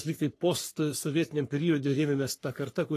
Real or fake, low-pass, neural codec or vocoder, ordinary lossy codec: fake; 14.4 kHz; codec, 44.1 kHz, 3.4 kbps, Pupu-Codec; AAC, 48 kbps